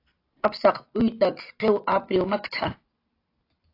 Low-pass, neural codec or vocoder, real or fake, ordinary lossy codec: 5.4 kHz; none; real; AAC, 32 kbps